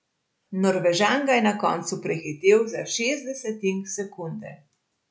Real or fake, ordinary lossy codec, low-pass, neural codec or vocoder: real; none; none; none